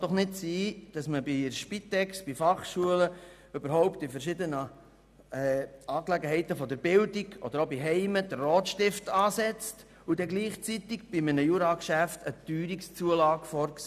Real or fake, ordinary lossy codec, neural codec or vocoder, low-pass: real; none; none; 14.4 kHz